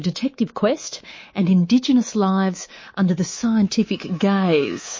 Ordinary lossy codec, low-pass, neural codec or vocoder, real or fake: MP3, 32 kbps; 7.2 kHz; codec, 24 kHz, 3.1 kbps, DualCodec; fake